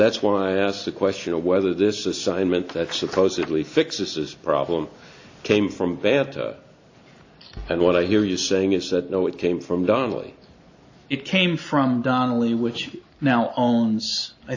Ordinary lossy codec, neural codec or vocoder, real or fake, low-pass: AAC, 48 kbps; none; real; 7.2 kHz